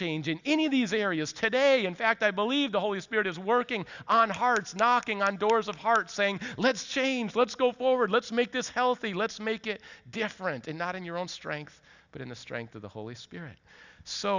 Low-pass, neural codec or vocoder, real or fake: 7.2 kHz; none; real